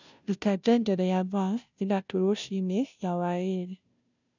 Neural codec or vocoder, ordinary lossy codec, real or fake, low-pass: codec, 16 kHz, 0.5 kbps, FunCodec, trained on Chinese and English, 25 frames a second; none; fake; 7.2 kHz